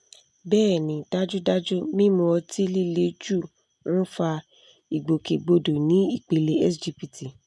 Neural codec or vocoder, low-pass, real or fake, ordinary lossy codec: none; none; real; none